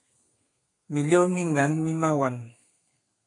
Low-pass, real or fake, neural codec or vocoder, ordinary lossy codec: 10.8 kHz; fake; codec, 32 kHz, 1.9 kbps, SNAC; AAC, 48 kbps